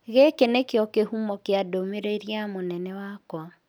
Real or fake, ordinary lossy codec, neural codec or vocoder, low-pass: real; none; none; none